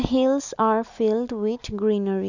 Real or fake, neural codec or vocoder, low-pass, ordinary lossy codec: real; none; 7.2 kHz; none